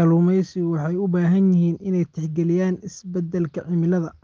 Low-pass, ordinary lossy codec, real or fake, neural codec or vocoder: 7.2 kHz; Opus, 32 kbps; real; none